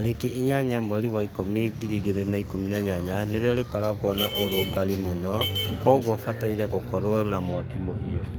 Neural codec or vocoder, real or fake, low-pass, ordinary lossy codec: codec, 44.1 kHz, 2.6 kbps, SNAC; fake; none; none